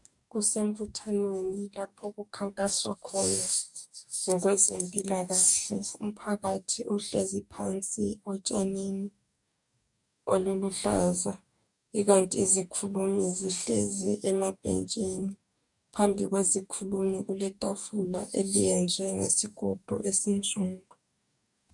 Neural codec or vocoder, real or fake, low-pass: codec, 44.1 kHz, 2.6 kbps, DAC; fake; 10.8 kHz